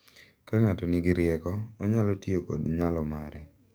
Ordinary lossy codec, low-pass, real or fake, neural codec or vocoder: none; none; fake; codec, 44.1 kHz, 7.8 kbps, DAC